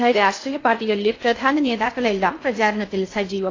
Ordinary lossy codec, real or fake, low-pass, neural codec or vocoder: AAC, 32 kbps; fake; 7.2 kHz; codec, 16 kHz in and 24 kHz out, 0.6 kbps, FocalCodec, streaming, 2048 codes